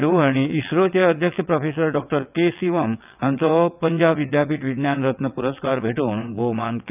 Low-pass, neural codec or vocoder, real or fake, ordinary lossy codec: 3.6 kHz; vocoder, 22.05 kHz, 80 mel bands, WaveNeXt; fake; none